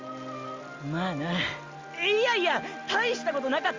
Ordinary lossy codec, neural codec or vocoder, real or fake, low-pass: Opus, 32 kbps; none; real; 7.2 kHz